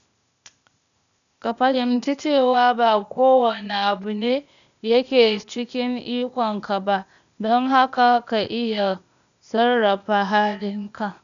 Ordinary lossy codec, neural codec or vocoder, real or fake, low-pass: none; codec, 16 kHz, 0.8 kbps, ZipCodec; fake; 7.2 kHz